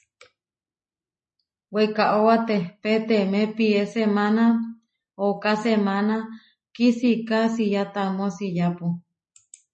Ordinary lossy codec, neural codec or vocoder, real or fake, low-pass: MP3, 32 kbps; none; real; 10.8 kHz